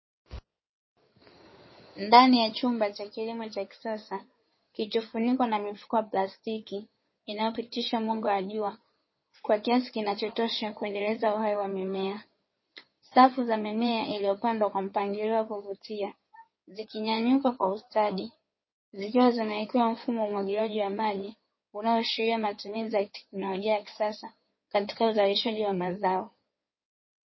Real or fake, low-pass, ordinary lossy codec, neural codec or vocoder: fake; 7.2 kHz; MP3, 24 kbps; codec, 16 kHz in and 24 kHz out, 2.2 kbps, FireRedTTS-2 codec